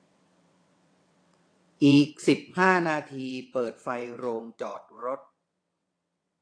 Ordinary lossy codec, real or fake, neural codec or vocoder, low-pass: AAC, 48 kbps; fake; vocoder, 22.05 kHz, 80 mel bands, WaveNeXt; 9.9 kHz